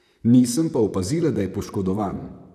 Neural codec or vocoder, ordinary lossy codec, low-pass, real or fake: vocoder, 44.1 kHz, 128 mel bands, Pupu-Vocoder; none; 14.4 kHz; fake